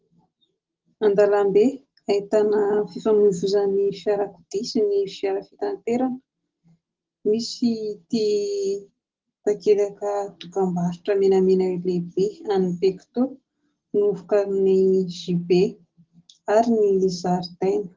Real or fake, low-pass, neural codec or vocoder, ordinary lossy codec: real; 7.2 kHz; none; Opus, 16 kbps